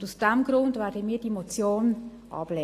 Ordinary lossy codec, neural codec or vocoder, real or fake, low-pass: AAC, 48 kbps; none; real; 14.4 kHz